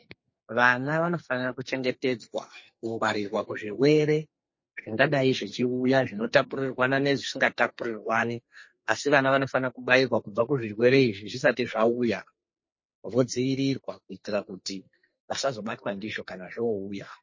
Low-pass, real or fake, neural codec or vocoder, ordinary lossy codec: 7.2 kHz; fake; codec, 44.1 kHz, 2.6 kbps, SNAC; MP3, 32 kbps